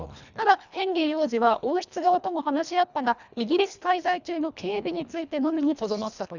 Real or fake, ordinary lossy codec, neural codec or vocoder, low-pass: fake; none; codec, 24 kHz, 1.5 kbps, HILCodec; 7.2 kHz